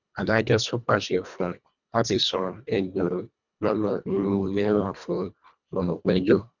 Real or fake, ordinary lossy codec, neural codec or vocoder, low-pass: fake; none; codec, 24 kHz, 1.5 kbps, HILCodec; 7.2 kHz